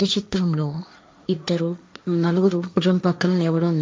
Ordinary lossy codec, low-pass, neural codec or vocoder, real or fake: none; none; codec, 16 kHz, 1.1 kbps, Voila-Tokenizer; fake